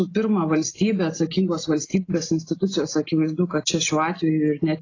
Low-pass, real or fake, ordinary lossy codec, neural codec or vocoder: 7.2 kHz; real; AAC, 32 kbps; none